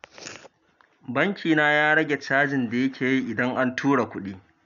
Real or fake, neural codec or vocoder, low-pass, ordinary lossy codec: real; none; 7.2 kHz; none